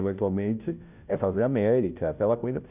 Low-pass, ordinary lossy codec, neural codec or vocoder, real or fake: 3.6 kHz; none; codec, 16 kHz, 0.5 kbps, FunCodec, trained on Chinese and English, 25 frames a second; fake